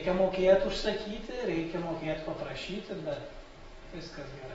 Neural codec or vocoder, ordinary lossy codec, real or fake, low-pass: vocoder, 48 kHz, 128 mel bands, Vocos; AAC, 24 kbps; fake; 19.8 kHz